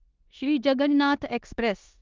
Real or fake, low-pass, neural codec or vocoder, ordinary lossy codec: fake; 7.2 kHz; codec, 16 kHz in and 24 kHz out, 0.9 kbps, LongCat-Audio-Codec, four codebook decoder; Opus, 24 kbps